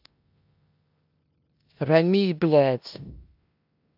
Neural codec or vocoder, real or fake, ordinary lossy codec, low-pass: codec, 16 kHz in and 24 kHz out, 0.9 kbps, LongCat-Audio-Codec, four codebook decoder; fake; AAC, 48 kbps; 5.4 kHz